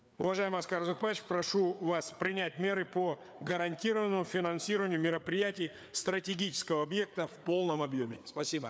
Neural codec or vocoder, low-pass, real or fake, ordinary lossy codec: codec, 16 kHz, 4 kbps, FreqCodec, larger model; none; fake; none